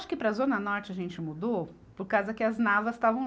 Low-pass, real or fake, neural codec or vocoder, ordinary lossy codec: none; real; none; none